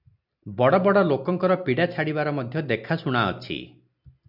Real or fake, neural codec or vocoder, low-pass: real; none; 5.4 kHz